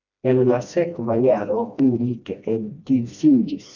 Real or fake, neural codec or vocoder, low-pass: fake; codec, 16 kHz, 1 kbps, FreqCodec, smaller model; 7.2 kHz